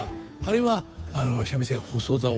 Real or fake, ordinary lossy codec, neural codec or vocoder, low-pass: fake; none; codec, 16 kHz, 2 kbps, FunCodec, trained on Chinese and English, 25 frames a second; none